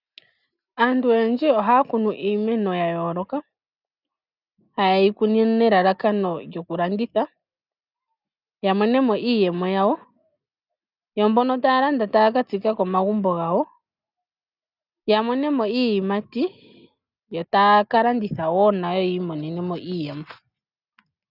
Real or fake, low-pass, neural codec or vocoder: real; 5.4 kHz; none